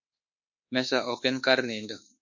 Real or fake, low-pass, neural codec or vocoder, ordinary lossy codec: fake; 7.2 kHz; codec, 24 kHz, 1.2 kbps, DualCodec; MP3, 48 kbps